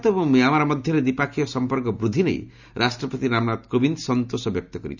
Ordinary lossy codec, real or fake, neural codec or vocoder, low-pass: none; real; none; 7.2 kHz